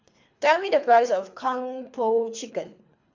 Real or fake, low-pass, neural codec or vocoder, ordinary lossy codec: fake; 7.2 kHz; codec, 24 kHz, 3 kbps, HILCodec; MP3, 64 kbps